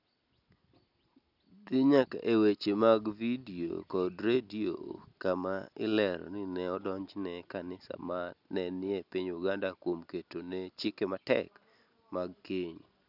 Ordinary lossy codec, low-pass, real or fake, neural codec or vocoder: none; 5.4 kHz; real; none